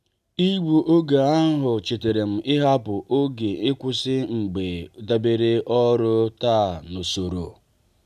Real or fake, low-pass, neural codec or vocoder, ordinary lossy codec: real; 14.4 kHz; none; none